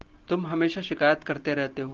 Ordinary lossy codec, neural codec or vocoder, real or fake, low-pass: Opus, 16 kbps; none; real; 7.2 kHz